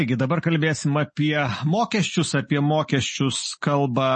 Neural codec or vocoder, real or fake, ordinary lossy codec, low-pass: none; real; MP3, 32 kbps; 10.8 kHz